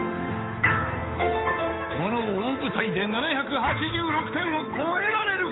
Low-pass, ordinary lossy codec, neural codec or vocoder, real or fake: 7.2 kHz; AAC, 16 kbps; codec, 16 kHz, 8 kbps, FunCodec, trained on Chinese and English, 25 frames a second; fake